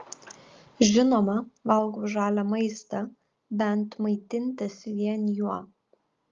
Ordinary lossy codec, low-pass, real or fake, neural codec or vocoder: Opus, 32 kbps; 7.2 kHz; real; none